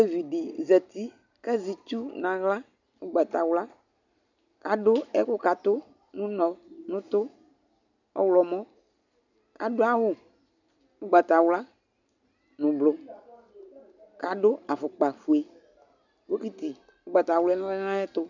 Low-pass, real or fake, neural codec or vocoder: 7.2 kHz; real; none